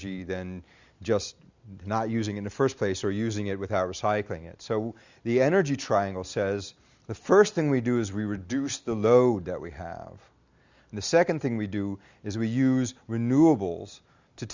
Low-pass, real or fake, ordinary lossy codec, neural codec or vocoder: 7.2 kHz; real; Opus, 64 kbps; none